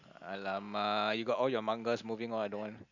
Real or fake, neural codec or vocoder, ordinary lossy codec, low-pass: real; none; none; 7.2 kHz